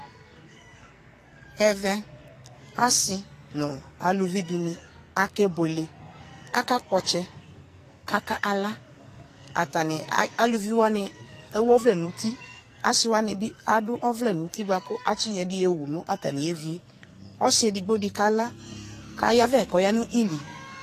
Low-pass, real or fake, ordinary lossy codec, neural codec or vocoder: 14.4 kHz; fake; AAC, 48 kbps; codec, 44.1 kHz, 2.6 kbps, SNAC